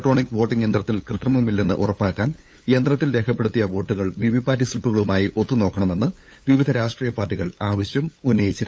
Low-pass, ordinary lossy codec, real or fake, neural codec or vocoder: none; none; fake; codec, 16 kHz, 8 kbps, FunCodec, trained on LibriTTS, 25 frames a second